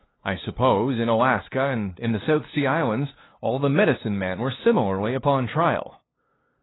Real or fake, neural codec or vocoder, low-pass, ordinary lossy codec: fake; codec, 16 kHz, 2 kbps, FunCodec, trained on LibriTTS, 25 frames a second; 7.2 kHz; AAC, 16 kbps